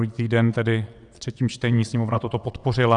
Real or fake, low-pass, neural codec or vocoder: fake; 9.9 kHz; vocoder, 22.05 kHz, 80 mel bands, WaveNeXt